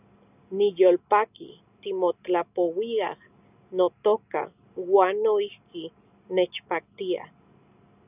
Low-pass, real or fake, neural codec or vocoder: 3.6 kHz; real; none